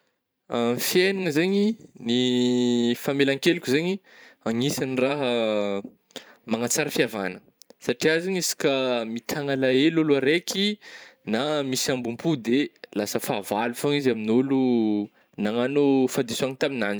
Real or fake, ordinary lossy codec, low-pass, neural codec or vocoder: fake; none; none; vocoder, 44.1 kHz, 128 mel bands every 512 samples, BigVGAN v2